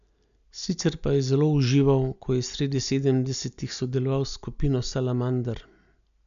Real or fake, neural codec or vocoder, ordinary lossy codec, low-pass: real; none; none; 7.2 kHz